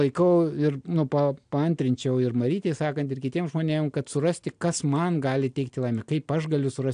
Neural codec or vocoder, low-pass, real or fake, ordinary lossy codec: none; 9.9 kHz; real; AAC, 64 kbps